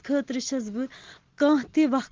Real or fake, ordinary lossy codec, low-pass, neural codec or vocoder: real; Opus, 16 kbps; 7.2 kHz; none